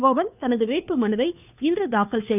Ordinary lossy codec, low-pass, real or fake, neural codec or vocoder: none; 3.6 kHz; fake; codec, 16 kHz, 2 kbps, FunCodec, trained on Chinese and English, 25 frames a second